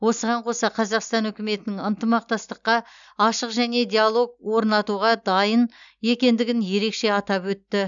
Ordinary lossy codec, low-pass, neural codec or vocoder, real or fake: none; 7.2 kHz; none; real